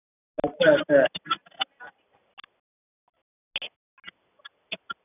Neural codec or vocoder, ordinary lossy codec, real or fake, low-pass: none; none; real; 3.6 kHz